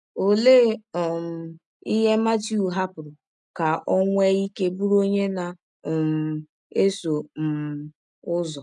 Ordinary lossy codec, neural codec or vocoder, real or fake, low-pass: none; none; real; 10.8 kHz